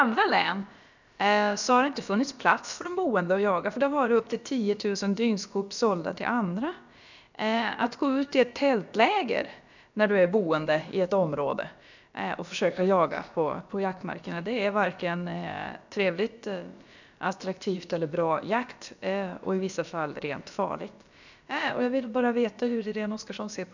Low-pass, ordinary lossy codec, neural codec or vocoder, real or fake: 7.2 kHz; none; codec, 16 kHz, about 1 kbps, DyCAST, with the encoder's durations; fake